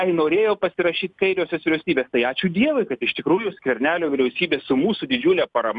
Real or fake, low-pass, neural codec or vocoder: real; 10.8 kHz; none